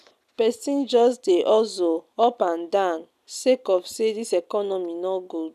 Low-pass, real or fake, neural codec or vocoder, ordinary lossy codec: 14.4 kHz; real; none; none